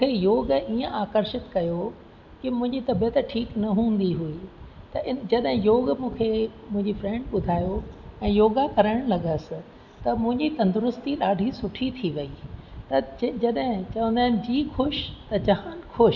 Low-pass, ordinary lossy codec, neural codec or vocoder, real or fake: 7.2 kHz; none; none; real